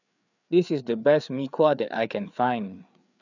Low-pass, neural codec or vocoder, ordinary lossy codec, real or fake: 7.2 kHz; codec, 16 kHz, 4 kbps, FreqCodec, larger model; none; fake